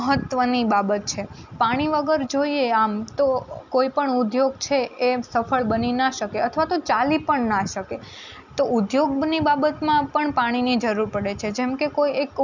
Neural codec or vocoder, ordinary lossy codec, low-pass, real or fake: none; none; 7.2 kHz; real